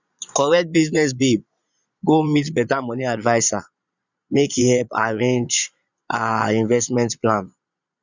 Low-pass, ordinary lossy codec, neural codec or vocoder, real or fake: 7.2 kHz; none; vocoder, 22.05 kHz, 80 mel bands, Vocos; fake